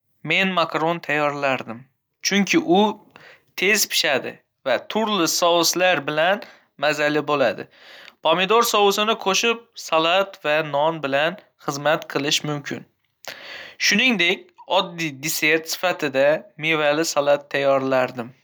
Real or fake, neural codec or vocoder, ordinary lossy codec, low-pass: real; none; none; none